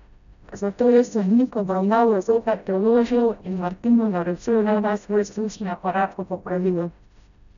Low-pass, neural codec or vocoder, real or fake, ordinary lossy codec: 7.2 kHz; codec, 16 kHz, 0.5 kbps, FreqCodec, smaller model; fake; none